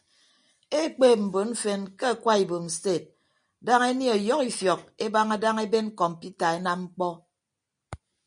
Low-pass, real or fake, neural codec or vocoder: 9.9 kHz; real; none